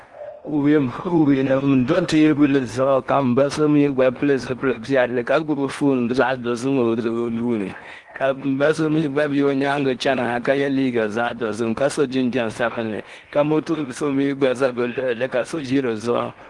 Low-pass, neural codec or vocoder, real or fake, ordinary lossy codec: 10.8 kHz; codec, 16 kHz in and 24 kHz out, 0.8 kbps, FocalCodec, streaming, 65536 codes; fake; Opus, 24 kbps